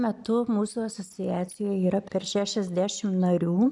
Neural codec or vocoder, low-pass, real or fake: none; 10.8 kHz; real